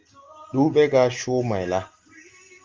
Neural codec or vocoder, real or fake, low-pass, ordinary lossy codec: none; real; 7.2 kHz; Opus, 32 kbps